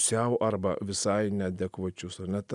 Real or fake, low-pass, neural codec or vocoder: real; 10.8 kHz; none